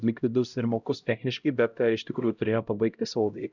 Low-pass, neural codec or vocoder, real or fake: 7.2 kHz; codec, 16 kHz, 0.5 kbps, X-Codec, HuBERT features, trained on LibriSpeech; fake